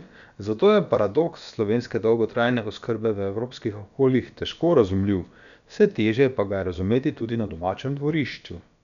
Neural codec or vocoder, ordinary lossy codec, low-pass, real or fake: codec, 16 kHz, about 1 kbps, DyCAST, with the encoder's durations; none; 7.2 kHz; fake